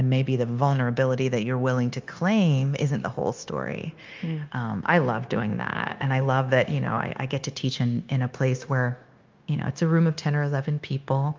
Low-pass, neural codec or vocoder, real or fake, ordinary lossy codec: 7.2 kHz; codec, 16 kHz, 0.9 kbps, LongCat-Audio-Codec; fake; Opus, 24 kbps